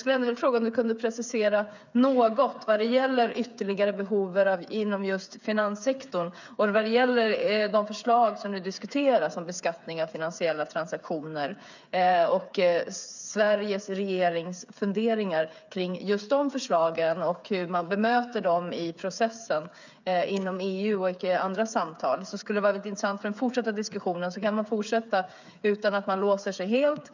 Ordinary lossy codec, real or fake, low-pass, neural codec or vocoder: none; fake; 7.2 kHz; codec, 16 kHz, 8 kbps, FreqCodec, smaller model